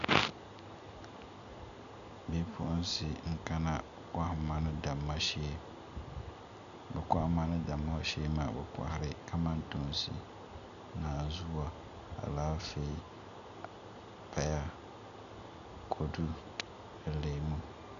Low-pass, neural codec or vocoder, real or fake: 7.2 kHz; none; real